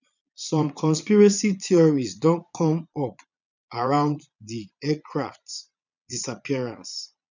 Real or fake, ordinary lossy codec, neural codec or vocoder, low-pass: fake; none; vocoder, 22.05 kHz, 80 mel bands, Vocos; 7.2 kHz